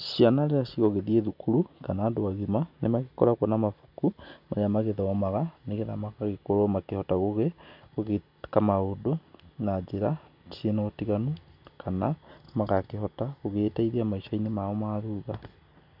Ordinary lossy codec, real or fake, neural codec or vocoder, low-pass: none; real; none; 5.4 kHz